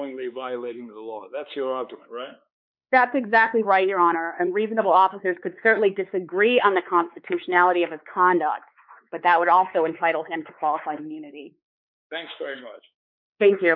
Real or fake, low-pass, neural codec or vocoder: fake; 5.4 kHz; codec, 16 kHz, 4 kbps, X-Codec, WavLM features, trained on Multilingual LibriSpeech